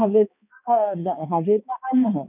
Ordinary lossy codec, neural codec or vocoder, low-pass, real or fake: none; codec, 16 kHz, 2 kbps, X-Codec, HuBERT features, trained on balanced general audio; 3.6 kHz; fake